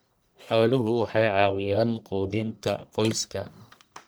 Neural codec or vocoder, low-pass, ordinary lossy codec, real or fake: codec, 44.1 kHz, 1.7 kbps, Pupu-Codec; none; none; fake